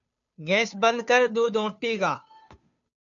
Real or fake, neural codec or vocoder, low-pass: fake; codec, 16 kHz, 2 kbps, FunCodec, trained on Chinese and English, 25 frames a second; 7.2 kHz